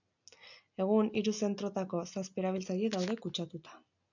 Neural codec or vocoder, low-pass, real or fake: none; 7.2 kHz; real